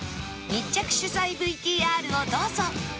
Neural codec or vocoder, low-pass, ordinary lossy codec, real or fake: none; none; none; real